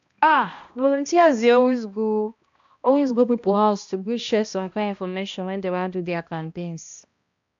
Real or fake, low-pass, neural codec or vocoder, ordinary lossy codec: fake; 7.2 kHz; codec, 16 kHz, 1 kbps, X-Codec, HuBERT features, trained on balanced general audio; AAC, 64 kbps